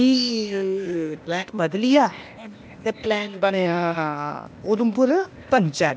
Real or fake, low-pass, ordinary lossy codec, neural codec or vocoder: fake; none; none; codec, 16 kHz, 0.8 kbps, ZipCodec